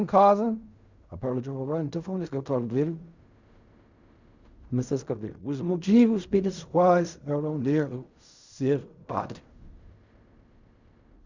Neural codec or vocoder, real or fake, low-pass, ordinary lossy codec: codec, 16 kHz in and 24 kHz out, 0.4 kbps, LongCat-Audio-Codec, fine tuned four codebook decoder; fake; 7.2 kHz; Opus, 64 kbps